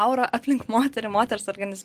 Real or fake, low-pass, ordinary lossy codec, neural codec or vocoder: real; 14.4 kHz; Opus, 24 kbps; none